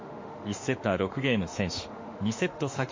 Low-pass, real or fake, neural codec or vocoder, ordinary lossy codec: 7.2 kHz; fake; autoencoder, 48 kHz, 32 numbers a frame, DAC-VAE, trained on Japanese speech; MP3, 32 kbps